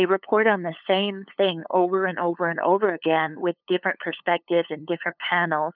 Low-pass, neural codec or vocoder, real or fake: 5.4 kHz; codec, 16 kHz, 8 kbps, FunCodec, trained on LibriTTS, 25 frames a second; fake